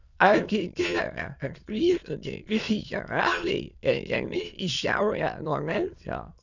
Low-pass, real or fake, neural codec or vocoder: 7.2 kHz; fake; autoencoder, 22.05 kHz, a latent of 192 numbers a frame, VITS, trained on many speakers